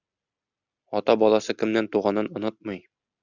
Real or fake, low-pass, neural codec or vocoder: real; 7.2 kHz; none